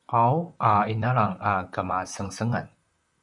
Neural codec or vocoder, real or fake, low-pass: vocoder, 44.1 kHz, 128 mel bands, Pupu-Vocoder; fake; 10.8 kHz